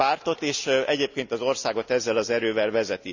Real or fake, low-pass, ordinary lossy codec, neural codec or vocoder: real; 7.2 kHz; none; none